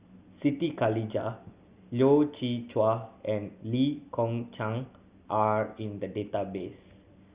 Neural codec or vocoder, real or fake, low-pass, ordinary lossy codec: none; real; 3.6 kHz; Opus, 64 kbps